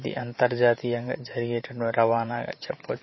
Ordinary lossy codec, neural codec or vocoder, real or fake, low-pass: MP3, 24 kbps; vocoder, 44.1 kHz, 128 mel bands every 512 samples, BigVGAN v2; fake; 7.2 kHz